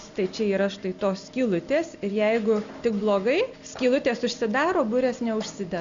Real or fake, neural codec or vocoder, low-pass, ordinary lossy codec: real; none; 7.2 kHz; Opus, 64 kbps